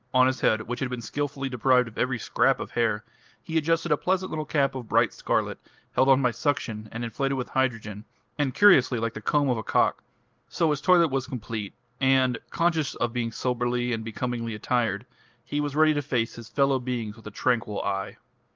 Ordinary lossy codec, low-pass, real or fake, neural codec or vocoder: Opus, 32 kbps; 7.2 kHz; real; none